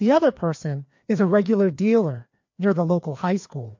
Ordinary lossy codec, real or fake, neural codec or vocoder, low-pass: MP3, 48 kbps; fake; codec, 16 kHz, 2 kbps, FreqCodec, larger model; 7.2 kHz